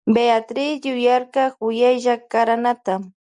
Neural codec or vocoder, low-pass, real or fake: none; 10.8 kHz; real